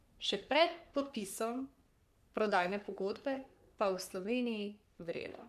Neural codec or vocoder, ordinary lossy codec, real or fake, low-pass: codec, 44.1 kHz, 3.4 kbps, Pupu-Codec; none; fake; 14.4 kHz